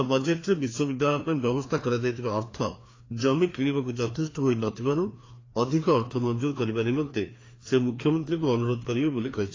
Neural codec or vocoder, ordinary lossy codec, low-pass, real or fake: codec, 16 kHz, 2 kbps, FreqCodec, larger model; AAC, 32 kbps; 7.2 kHz; fake